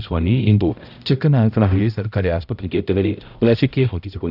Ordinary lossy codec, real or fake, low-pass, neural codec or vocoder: none; fake; 5.4 kHz; codec, 16 kHz, 0.5 kbps, X-Codec, HuBERT features, trained on balanced general audio